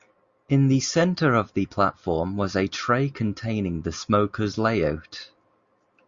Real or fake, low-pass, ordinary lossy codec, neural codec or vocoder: real; 7.2 kHz; Opus, 64 kbps; none